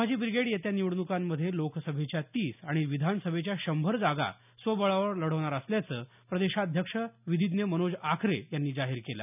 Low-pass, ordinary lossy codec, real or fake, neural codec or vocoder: 3.6 kHz; none; real; none